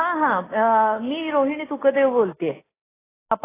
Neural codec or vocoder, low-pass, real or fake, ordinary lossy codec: none; 3.6 kHz; real; AAC, 16 kbps